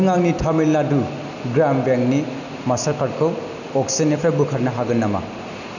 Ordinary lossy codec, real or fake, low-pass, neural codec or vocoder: none; real; 7.2 kHz; none